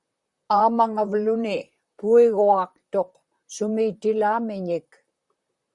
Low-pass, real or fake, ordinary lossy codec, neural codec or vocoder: 10.8 kHz; fake; Opus, 64 kbps; vocoder, 44.1 kHz, 128 mel bands, Pupu-Vocoder